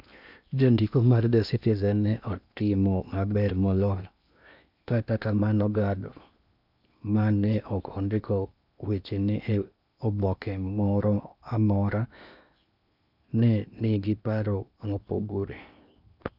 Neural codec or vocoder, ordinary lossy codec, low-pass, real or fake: codec, 16 kHz in and 24 kHz out, 0.8 kbps, FocalCodec, streaming, 65536 codes; none; 5.4 kHz; fake